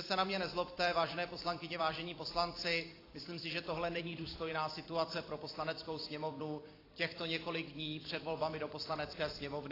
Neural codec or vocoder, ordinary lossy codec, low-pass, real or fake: vocoder, 44.1 kHz, 128 mel bands every 256 samples, BigVGAN v2; AAC, 24 kbps; 5.4 kHz; fake